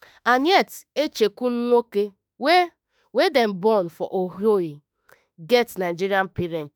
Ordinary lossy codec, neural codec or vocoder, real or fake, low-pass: none; autoencoder, 48 kHz, 32 numbers a frame, DAC-VAE, trained on Japanese speech; fake; none